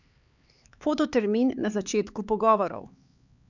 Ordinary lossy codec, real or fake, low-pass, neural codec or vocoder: none; fake; 7.2 kHz; codec, 16 kHz, 4 kbps, X-Codec, HuBERT features, trained on LibriSpeech